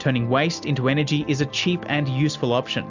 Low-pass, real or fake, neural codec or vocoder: 7.2 kHz; real; none